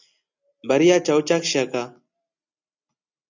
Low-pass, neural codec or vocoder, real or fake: 7.2 kHz; none; real